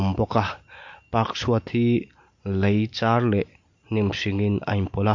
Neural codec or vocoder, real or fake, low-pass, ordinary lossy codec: none; real; 7.2 kHz; MP3, 48 kbps